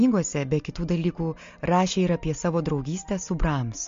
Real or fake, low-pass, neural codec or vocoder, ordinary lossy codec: real; 7.2 kHz; none; MP3, 48 kbps